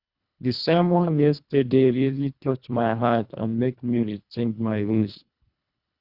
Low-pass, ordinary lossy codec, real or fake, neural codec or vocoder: 5.4 kHz; Opus, 64 kbps; fake; codec, 24 kHz, 1.5 kbps, HILCodec